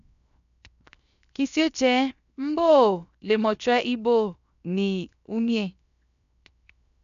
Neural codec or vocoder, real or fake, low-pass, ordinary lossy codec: codec, 16 kHz, 0.7 kbps, FocalCodec; fake; 7.2 kHz; none